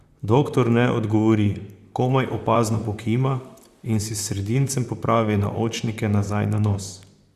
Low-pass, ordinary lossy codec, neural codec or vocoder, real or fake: 14.4 kHz; Opus, 64 kbps; vocoder, 44.1 kHz, 128 mel bands, Pupu-Vocoder; fake